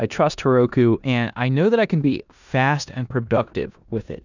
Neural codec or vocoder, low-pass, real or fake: codec, 16 kHz in and 24 kHz out, 0.9 kbps, LongCat-Audio-Codec, four codebook decoder; 7.2 kHz; fake